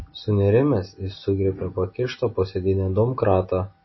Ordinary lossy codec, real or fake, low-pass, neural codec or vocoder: MP3, 24 kbps; real; 7.2 kHz; none